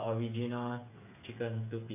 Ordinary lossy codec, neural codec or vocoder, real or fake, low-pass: none; codec, 16 kHz, 8 kbps, FreqCodec, smaller model; fake; 3.6 kHz